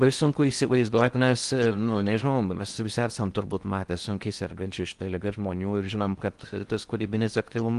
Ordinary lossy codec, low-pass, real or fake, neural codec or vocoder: Opus, 24 kbps; 10.8 kHz; fake; codec, 16 kHz in and 24 kHz out, 0.6 kbps, FocalCodec, streaming, 4096 codes